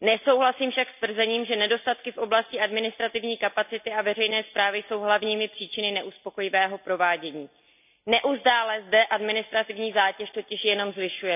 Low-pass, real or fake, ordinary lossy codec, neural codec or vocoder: 3.6 kHz; real; none; none